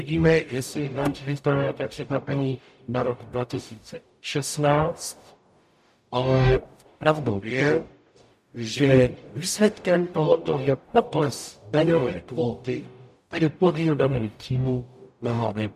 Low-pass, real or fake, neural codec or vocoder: 14.4 kHz; fake; codec, 44.1 kHz, 0.9 kbps, DAC